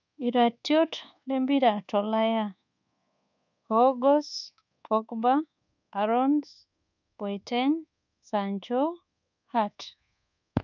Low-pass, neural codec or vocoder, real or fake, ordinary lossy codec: 7.2 kHz; codec, 24 kHz, 1.2 kbps, DualCodec; fake; none